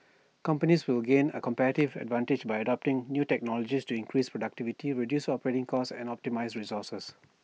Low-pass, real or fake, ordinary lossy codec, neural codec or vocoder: none; real; none; none